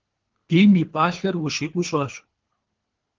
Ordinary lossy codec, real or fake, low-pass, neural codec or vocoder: Opus, 16 kbps; fake; 7.2 kHz; codec, 24 kHz, 3 kbps, HILCodec